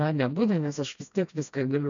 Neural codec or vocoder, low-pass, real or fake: codec, 16 kHz, 1 kbps, FreqCodec, smaller model; 7.2 kHz; fake